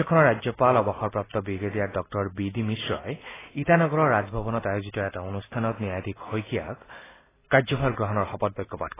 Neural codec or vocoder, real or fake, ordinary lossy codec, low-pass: none; real; AAC, 16 kbps; 3.6 kHz